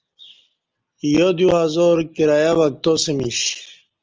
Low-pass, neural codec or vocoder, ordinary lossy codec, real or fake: 7.2 kHz; none; Opus, 24 kbps; real